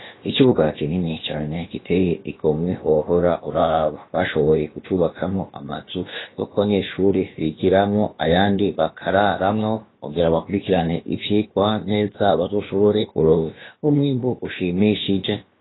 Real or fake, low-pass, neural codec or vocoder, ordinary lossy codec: fake; 7.2 kHz; codec, 16 kHz, about 1 kbps, DyCAST, with the encoder's durations; AAC, 16 kbps